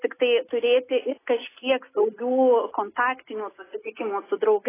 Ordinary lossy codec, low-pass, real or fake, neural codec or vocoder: AAC, 16 kbps; 3.6 kHz; real; none